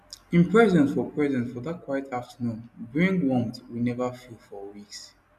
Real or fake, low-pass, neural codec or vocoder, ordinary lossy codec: real; 14.4 kHz; none; none